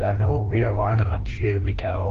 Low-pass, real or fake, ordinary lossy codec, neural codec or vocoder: 7.2 kHz; fake; Opus, 16 kbps; codec, 16 kHz, 1 kbps, FreqCodec, larger model